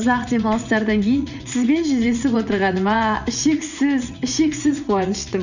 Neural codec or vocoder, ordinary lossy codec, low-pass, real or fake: vocoder, 44.1 kHz, 80 mel bands, Vocos; none; 7.2 kHz; fake